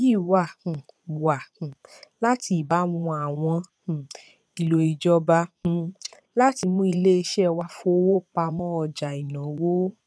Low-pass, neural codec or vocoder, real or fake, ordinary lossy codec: none; vocoder, 22.05 kHz, 80 mel bands, Vocos; fake; none